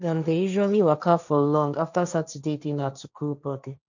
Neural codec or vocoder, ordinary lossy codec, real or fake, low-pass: codec, 16 kHz, 1.1 kbps, Voila-Tokenizer; none; fake; 7.2 kHz